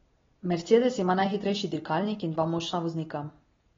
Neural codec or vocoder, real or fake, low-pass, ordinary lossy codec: none; real; 7.2 kHz; AAC, 24 kbps